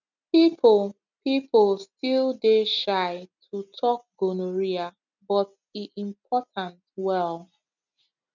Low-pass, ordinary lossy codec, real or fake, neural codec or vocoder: 7.2 kHz; none; real; none